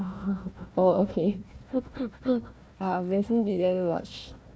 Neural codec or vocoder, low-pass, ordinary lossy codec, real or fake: codec, 16 kHz, 1 kbps, FunCodec, trained on Chinese and English, 50 frames a second; none; none; fake